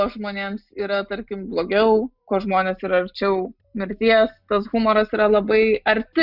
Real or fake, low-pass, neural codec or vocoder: real; 5.4 kHz; none